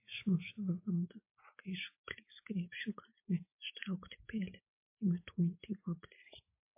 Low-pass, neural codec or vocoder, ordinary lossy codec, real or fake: 3.6 kHz; none; MP3, 24 kbps; real